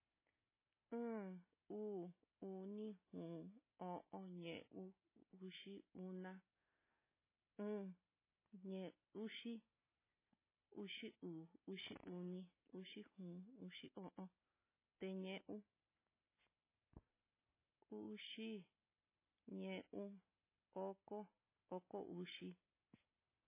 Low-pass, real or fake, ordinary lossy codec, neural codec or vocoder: 3.6 kHz; real; MP3, 16 kbps; none